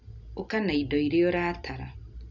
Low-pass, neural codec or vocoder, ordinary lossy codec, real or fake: 7.2 kHz; none; none; real